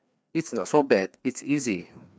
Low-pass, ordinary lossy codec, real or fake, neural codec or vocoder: none; none; fake; codec, 16 kHz, 2 kbps, FreqCodec, larger model